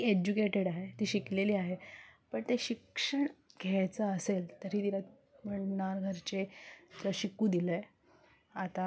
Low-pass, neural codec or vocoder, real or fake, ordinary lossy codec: none; none; real; none